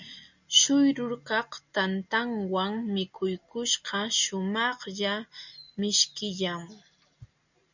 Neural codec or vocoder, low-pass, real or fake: none; 7.2 kHz; real